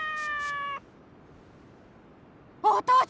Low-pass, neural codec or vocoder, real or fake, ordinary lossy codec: none; none; real; none